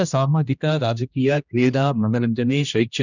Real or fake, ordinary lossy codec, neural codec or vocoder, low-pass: fake; none; codec, 16 kHz, 1 kbps, X-Codec, HuBERT features, trained on general audio; 7.2 kHz